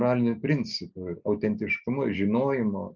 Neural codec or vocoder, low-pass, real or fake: none; 7.2 kHz; real